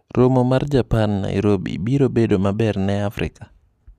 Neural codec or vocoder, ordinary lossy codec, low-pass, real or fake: none; none; 14.4 kHz; real